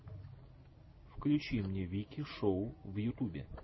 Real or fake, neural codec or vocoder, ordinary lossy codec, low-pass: real; none; MP3, 24 kbps; 7.2 kHz